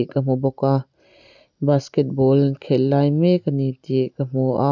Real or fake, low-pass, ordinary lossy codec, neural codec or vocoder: real; 7.2 kHz; none; none